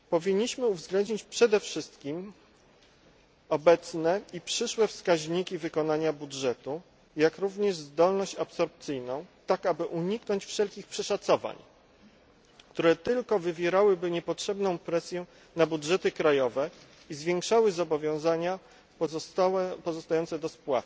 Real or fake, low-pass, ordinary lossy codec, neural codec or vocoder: real; none; none; none